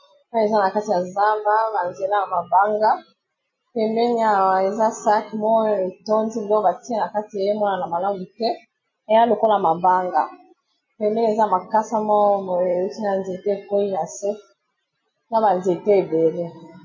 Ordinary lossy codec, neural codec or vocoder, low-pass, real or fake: MP3, 32 kbps; none; 7.2 kHz; real